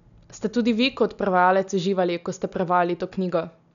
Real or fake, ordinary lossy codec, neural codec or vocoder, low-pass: real; none; none; 7.2 kHz